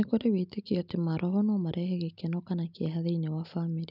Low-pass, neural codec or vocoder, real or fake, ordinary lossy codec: 5.4 kHz; none; real; none